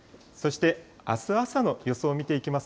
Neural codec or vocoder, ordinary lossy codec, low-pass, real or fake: none; none; none; real